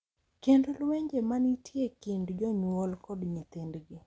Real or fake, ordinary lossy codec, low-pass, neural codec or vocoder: real; none; none; none